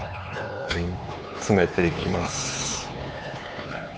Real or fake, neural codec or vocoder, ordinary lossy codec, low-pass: fake; codec, 16 kHz, 4 kbps, X-Codec, HuBERT features, trained on LibriSpeech; none; none